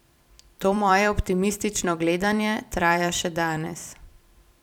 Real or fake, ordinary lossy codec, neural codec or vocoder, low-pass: fake; none; vocoder, 44.1 kHz, 128 mel bands every 256 samples, BigVGAN v2; 19.8 kHz